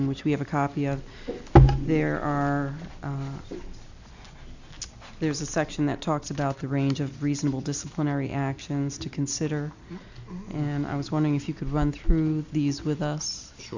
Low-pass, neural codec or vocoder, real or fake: 7.2 kHz; none; real